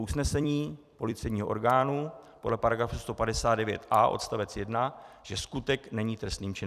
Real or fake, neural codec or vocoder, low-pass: fake; vocoder, 44.1 kHz, 128 mel bands every 256 samples, BigVGAN v2; 14.4 kHz